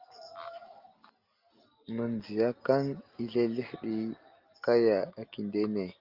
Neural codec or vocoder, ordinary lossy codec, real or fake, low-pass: none; Opus, 32 kbps; real; 5.4 kHz